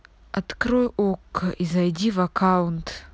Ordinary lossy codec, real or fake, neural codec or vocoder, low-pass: none; real; none; none